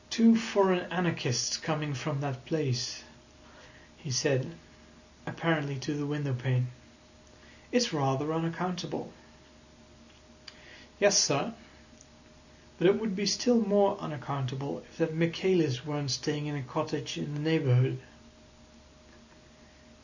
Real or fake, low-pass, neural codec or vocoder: real; 7.2 kHz; none